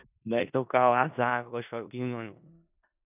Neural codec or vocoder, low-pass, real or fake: codec, 16 kHz in and 24 kHz out, 0.4 kbps, LongCat-Audio-Codec, four codebook decoder; 3.6 kHz; fake